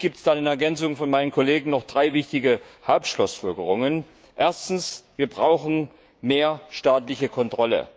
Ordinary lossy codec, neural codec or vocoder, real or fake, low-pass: none; codec, 16 kHz, 6 kbps, DAC; fake; none